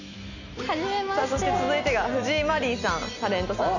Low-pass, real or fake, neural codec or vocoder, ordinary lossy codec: 7.2 kHz; real; none; none